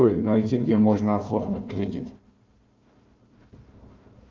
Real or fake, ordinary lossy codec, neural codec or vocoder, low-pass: fake; Opus, 24 kbps; codec, 16 kHz, 1 kbps, FunCodec, trained on Chinese and English, 50 frames a second; 7.2 kHz